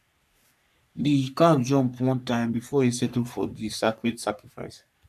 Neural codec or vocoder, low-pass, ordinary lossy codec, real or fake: codec, 44.1 kHz, 3.4 kbps, Pupu-Codec; 14.4 kHz; none; fake